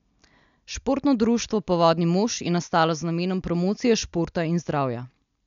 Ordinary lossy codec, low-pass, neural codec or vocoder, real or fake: none; 7.2 kHz; none; real